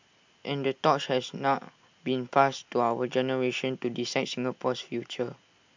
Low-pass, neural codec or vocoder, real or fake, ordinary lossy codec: 7.2 kHz; none; real; MP3, 64 kbps